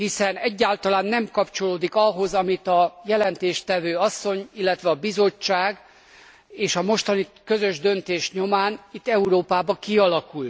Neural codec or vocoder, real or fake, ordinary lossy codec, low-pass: none; real; none; none